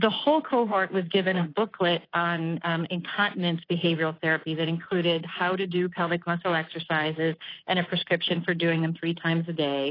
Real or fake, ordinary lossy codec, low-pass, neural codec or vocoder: fake; AAC, 24 kbps; 5.4 kHz; codec, 24 kHz, 3.1 kbps, DualCodec